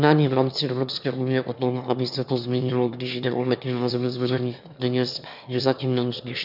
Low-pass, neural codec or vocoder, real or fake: 5.4 kHz; autoencoder, 22.05 kHz, a latent of 192 numbers a frame, VITS, trained on one speaker; fake